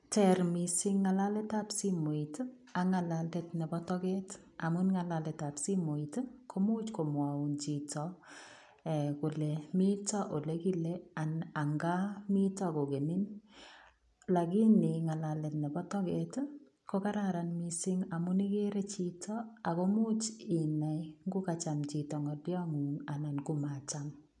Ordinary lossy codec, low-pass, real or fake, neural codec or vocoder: none; 10.8 kHz; real; none